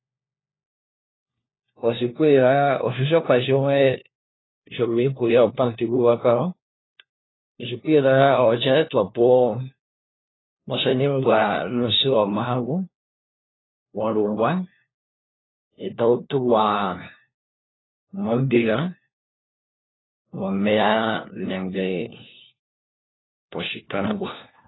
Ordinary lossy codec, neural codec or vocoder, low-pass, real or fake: AAC, 16 kbps; codec, 16 kHz, 1 kbps, FunCodec, trained on LibriTTS, 50 frames a second; 7.2 kHz; fake